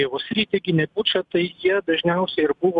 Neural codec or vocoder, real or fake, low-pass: none; real; 10.8 kHz